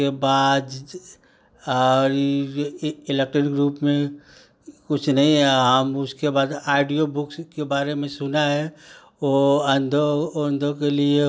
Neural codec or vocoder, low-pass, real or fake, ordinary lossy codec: none; none; real; none